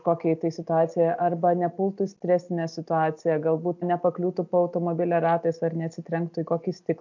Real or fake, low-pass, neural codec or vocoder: real; 7.2 kHz; none